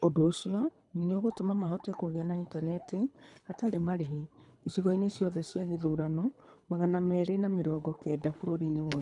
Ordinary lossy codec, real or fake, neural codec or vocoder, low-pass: none; fake; codec, 24 kHz, 3 kbps, HILCodec; 10.8 kHz